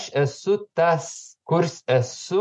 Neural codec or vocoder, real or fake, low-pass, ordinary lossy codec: none; real; 10.8 kHz; MP3, 48 kbps